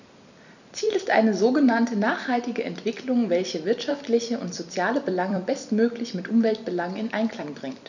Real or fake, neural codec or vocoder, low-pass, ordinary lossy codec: fake; vocoder, 44.1 kHz, 128 mel bands every 256 samples, BigVGAN v2; 7.2 kHz; none